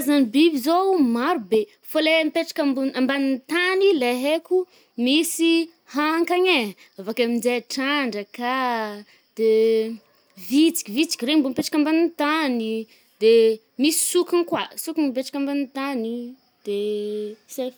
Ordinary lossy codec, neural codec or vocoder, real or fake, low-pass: none; none; real; none